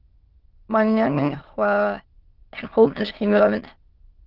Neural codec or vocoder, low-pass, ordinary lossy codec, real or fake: autoencoder, 22.05 kHz, a latent of 192 numbers a frame, VITS, trained on many speakers; 5.4 kHz; Opus, 16 kbps; fake